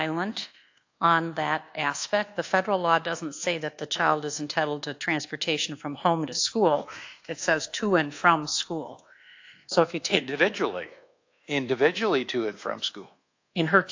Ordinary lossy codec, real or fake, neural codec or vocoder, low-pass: AAC, 48 kbps; fake; codec, 24 kHz, 1.2 kbps, DualCodec; 7.2 kHz